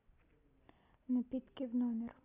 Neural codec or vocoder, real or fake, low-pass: none; real; 3.6 kHz